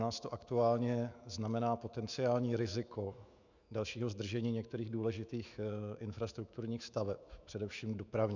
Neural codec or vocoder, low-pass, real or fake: autoencoder, 48 kHz, 128 numbers a frame, DAC-VAE, trained on Japanese speech; 7.2 kHz; fake